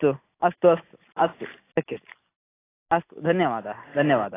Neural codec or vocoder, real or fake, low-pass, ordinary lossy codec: none; real; 3.6 kHz; AAC, 16 kbps